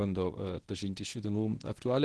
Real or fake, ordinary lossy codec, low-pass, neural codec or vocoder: fake; Opus, 16 kbps; 10.8 kHz; codec, 24 kHz, 0.9 kbps, WavTokenizer, medium speech release version 1